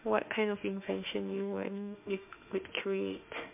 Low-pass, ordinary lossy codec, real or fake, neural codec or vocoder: 3.6 kHz; MP3, 32 kbps; fake; autoencoder, 48 kHz, 32 numbers a frame, DAC-VAE, trained on Japanese speech